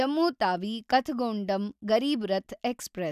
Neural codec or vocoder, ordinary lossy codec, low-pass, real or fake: none; none; 14.4 kHz; real